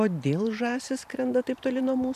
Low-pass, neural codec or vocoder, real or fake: 14.4 kHz; vocoder, 44.1 kHz, 128 mel bands every 512 samples, BigVGAN v2; fake